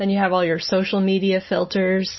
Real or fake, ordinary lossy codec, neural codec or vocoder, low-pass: real; MP3, 24 kbps; none; 7.2 kHz